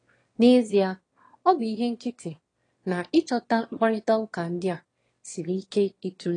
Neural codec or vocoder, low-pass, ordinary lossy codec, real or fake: autoencoder, 22.05 kHz, a latent of 192 numbers a frame, VITS, trained on one speaker; 9.9 kHz; AAC, 32 kbps; fake